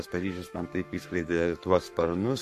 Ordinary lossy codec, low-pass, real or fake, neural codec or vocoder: MP3, 64 kbps; 14.4 kHz; fake; codec, 44.1 kHz, 7.8 kbps, Pupu-Codec